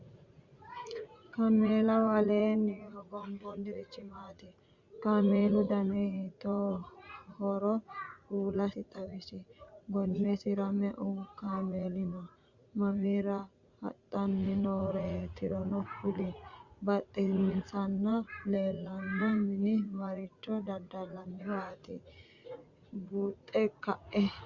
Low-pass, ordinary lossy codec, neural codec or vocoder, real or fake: 7.2 kHz; Opus, 64 kbps; vocoder, 44.1 kHz, 80 mel bands, Vocos; fake